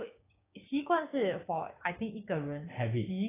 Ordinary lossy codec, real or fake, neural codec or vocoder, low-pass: AAC, 16 kbps; fake; codec, 16 kHz, 6 kbps, DAC; 3.6 kHz